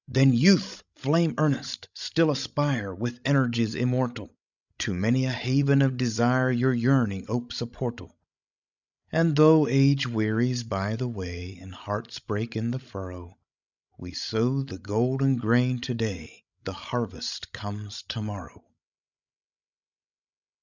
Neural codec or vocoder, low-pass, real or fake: codec, 16 kHz, 16 kbps, FreqCodec, larger model; 7.2 kHz; fake